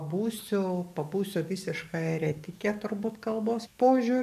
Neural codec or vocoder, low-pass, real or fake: codec, 44.1 kHz, 7.8 kbps, DAC; 14.4 kHz; fake